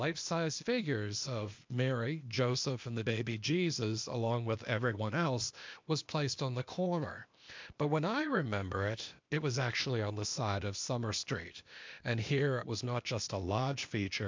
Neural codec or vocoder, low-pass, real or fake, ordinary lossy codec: codec, 16 kHz, 0.8 kbps, ZipCodec; 7.2 kHz; fake; MP3, 64 kbps